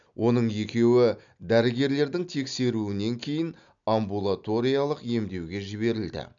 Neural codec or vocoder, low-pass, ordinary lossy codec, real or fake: none; 7.2 kHz; none; real